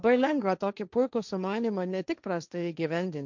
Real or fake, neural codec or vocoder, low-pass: fake; codec, 16 kHz, 1.1 kbps, Voila-Tokenizer; 7.2 kHz